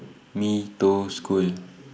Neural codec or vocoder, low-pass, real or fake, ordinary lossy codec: none; none; real; none